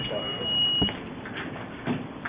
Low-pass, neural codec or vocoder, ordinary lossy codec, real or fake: 3.6 kHz; none; Opus, 64 kbps; real